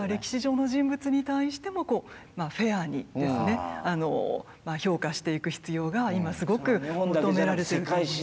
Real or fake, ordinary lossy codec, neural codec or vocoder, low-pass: real; none; none; none